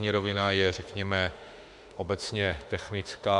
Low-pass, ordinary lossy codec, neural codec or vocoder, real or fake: 10.8 kHz; Opus, 64 kbps; autoencoder, 48 kHz, 32 numbers a frame, DAC-VAE, trained on Japanese speech; fake